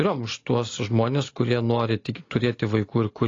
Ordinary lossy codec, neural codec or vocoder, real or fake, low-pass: AAC, 32 kbps; none; real; 7.2 kHz